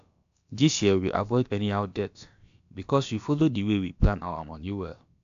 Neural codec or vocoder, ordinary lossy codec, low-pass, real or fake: codec, 16 kHz, about 1 kbps, DyCAST, with the encoder's durations; AAC, 64 kbps; 7.2 kHz; fake